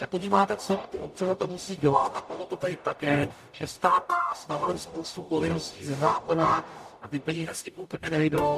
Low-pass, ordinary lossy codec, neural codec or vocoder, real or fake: 14.4 kHz; AAC, 96 kbps; codec, 44.1 kHz, 0.9 kbps, DAC; fake